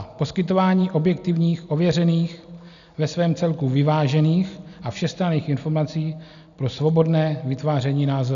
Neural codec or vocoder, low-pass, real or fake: none; 7.2 kHz; real